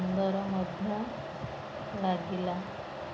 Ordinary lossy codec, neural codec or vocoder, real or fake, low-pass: none; none; real; none